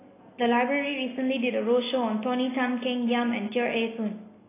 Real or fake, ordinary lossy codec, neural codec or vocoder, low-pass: real; AAC, 16 kbps; none; 3.6 kHz